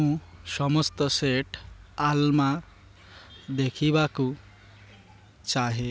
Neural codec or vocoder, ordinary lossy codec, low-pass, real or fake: none; none; none; real